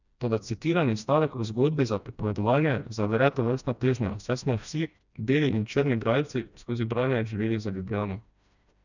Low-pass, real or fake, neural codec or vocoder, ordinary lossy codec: 7.2 kHz; fake; codec, 16 kHz, 1 kbps, FreqCodec, smaller model; none